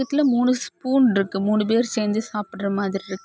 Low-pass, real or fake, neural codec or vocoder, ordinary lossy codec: none; real; none; none